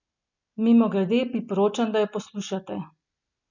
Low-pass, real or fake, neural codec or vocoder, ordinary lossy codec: 7.2 kHz; real; none; none